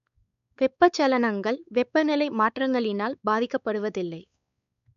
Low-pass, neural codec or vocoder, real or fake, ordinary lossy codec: 7.2 kHz; codec, 16 kHz, 4 kbps, X-Codec, WavLM features, trained on Multilingual LibriSpeech; fake; none